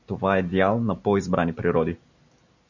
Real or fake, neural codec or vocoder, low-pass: real; none; 7.2 kHz